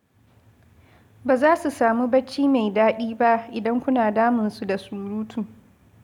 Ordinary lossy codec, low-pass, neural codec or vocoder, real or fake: none; 19.8 kHz; none; real